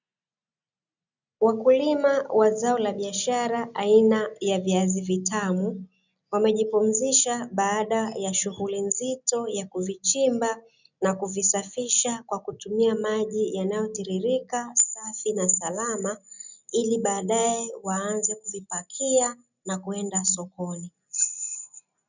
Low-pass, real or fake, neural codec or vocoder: 7.2 kHz; real; none